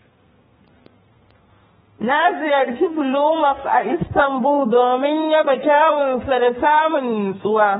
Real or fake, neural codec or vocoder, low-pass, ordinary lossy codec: fake; codec, 32 kHz, 1.9 kbps, SNAC; 14.4 kHz; AAC, 16 kbps